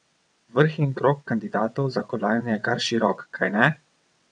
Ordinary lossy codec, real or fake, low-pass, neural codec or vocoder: none; fake; 9.9 kHz; vocoder, 22.05 kHz, 80 mel bands, WaveNeXt